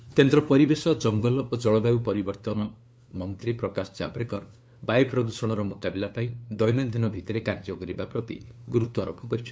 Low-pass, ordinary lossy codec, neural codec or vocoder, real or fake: none; none; codec, 16 kHz, 2 kbps, FunCodec, trained on LibriTTS, 25 frames a second; fake